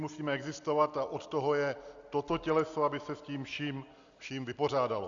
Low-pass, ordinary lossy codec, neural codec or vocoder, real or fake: 7.2 kHz; Opus, 64 kbps; none; real